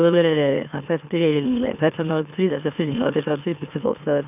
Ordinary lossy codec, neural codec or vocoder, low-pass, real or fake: none; autoencoder, 44.1 kHz, a latent of 192 numbers a frame, MeloTTS; 3.6 kHz; fake